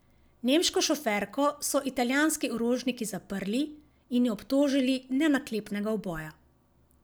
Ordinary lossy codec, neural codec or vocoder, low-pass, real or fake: none; none; none; real